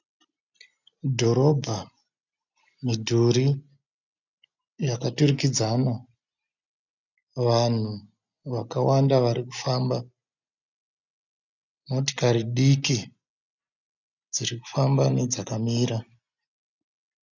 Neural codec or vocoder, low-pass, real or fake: none; 7.2 kHz; real